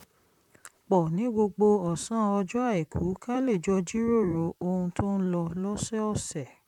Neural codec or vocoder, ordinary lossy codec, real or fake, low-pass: vocoder, 44.1 kHz, 128 mel bands, Pupu-Vocoder; none; fake; 19.8 kHz